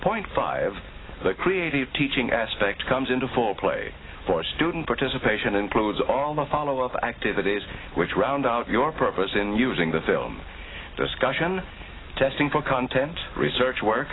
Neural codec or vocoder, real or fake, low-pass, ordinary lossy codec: none; real; 7.2 kHz; AAC, 16 kbps